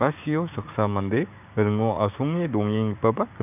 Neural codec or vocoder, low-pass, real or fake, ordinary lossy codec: codec, 16 kHz, 6 kbps, DAC; 3.6 kHz; fake; none